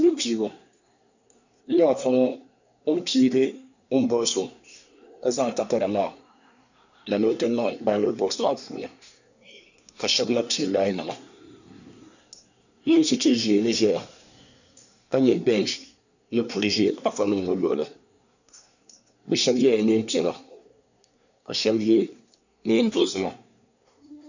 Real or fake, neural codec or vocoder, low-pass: fake; codec, 24 kHz, 1 kbps, SNAC; 7.2 kHz